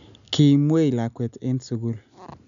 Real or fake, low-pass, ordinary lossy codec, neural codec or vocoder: real; 7.2 kHz; none; none